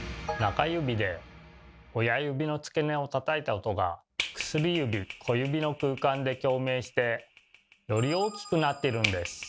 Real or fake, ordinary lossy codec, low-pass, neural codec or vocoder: real; none; none; none